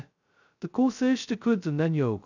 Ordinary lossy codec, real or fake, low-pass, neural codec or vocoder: none; fake; 7.2 kHz; codec, 16 kHz, 0.2 kbps, FocalCodec